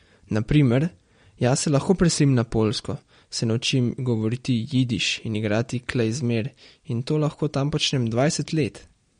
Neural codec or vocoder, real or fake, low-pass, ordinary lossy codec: none; real; 9.9 kHz; MP3, 48 kbps